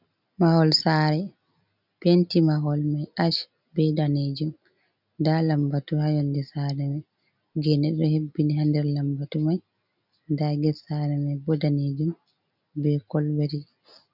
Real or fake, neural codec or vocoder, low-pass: real; none; 5.4 kHz